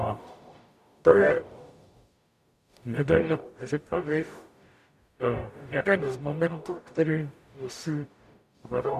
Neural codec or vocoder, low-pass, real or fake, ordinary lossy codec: codec, 44.1 kHz, 0.9 kbps, DAC; 14.4 kHz; fake; MP3, 96 kbps